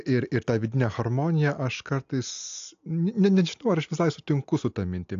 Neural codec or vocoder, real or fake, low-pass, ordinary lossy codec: none; real; 7.2 kHz; AAC, 64 kbps